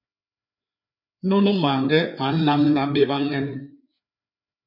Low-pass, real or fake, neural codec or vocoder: 5.4 kHz; fake; codec, 16 kHz, 4 kbps, FreqCodec, larger model